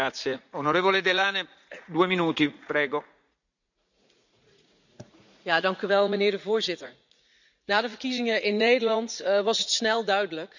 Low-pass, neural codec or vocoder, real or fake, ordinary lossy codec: 7.2 kHz; vocoder, 44.1 kHz, 80 mel bands, Vocos; fake; none